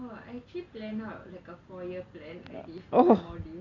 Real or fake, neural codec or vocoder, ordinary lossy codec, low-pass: real; none; MP3, 64 kbps; 7.2 kHz